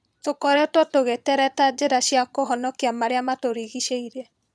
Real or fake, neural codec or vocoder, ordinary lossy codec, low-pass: fake; vocoder, 22.05 kHz, 80 mel bands, Vocos; none; none